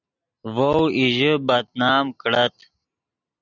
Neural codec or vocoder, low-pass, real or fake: none; 7.2 kHz; real